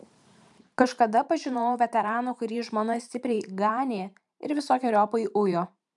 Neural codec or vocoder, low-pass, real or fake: vocoder, 44.1 kHz, 128 mel bands every 512 samples, BigVGAN v2; 10.8 kHz; fake